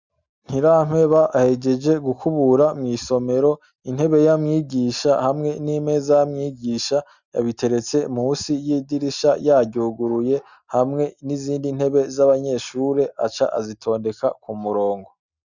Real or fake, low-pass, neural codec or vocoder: real; 7.2 kHz; none